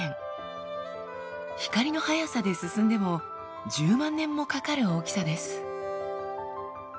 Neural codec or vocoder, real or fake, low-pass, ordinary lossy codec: none; real; none; none